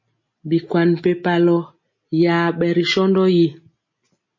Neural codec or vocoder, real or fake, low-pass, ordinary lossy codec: none; real; 7.2 kHz; MP3, 32 kbps